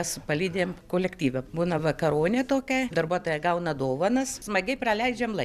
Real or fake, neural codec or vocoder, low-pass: real; none; 14.4 kHz